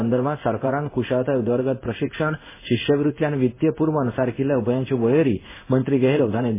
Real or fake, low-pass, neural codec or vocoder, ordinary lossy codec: fake; 3.6 kHz; codec, 16 kHz in and 24 kHz out, 1 kbps, XY-Tokenizer; MP3, 16 kbps